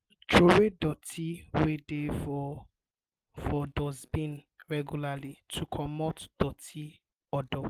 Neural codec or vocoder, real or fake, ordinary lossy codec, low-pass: none; real; Opus, 24 kbps; 14.4 kHz